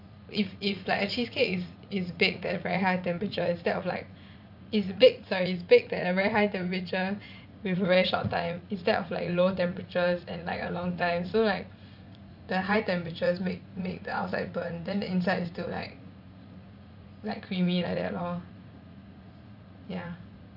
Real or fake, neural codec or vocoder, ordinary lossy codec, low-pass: fake; vocoder, 44.1 kHz, 80 mel bands, Vocos; none; 5.4 kHz